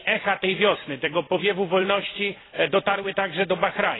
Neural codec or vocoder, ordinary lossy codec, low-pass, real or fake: vocoder, 44.1 kHz, 128 mel bands every 256 samples, BigVGAN v2; AAC, 16 kbps; 7.2 kHz; fake